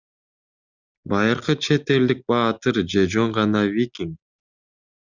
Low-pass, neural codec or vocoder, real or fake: 7.2 kHz; none; real